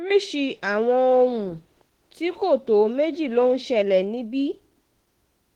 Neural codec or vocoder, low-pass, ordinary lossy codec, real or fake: autoencoder, 48 kHz, 32 numbers a frame, DAC-VAE, trained on Japanese speech; 19.8 kHz; Opus, 16 kbps; fake